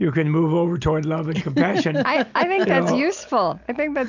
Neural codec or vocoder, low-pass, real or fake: none; 7.2 kHz; real